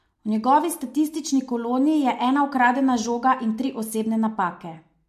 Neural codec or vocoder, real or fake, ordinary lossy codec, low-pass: none; real; MP3, 64 kbps; 14.4 kHz